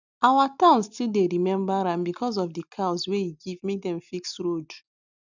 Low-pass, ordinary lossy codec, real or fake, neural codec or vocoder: 7.2 kHz; none; real; none